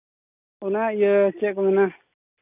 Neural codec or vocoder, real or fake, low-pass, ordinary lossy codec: none; real; 3.6 kHz; none